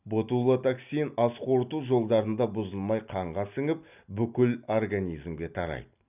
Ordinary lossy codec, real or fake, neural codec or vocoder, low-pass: none; real; none; 3.6 kHz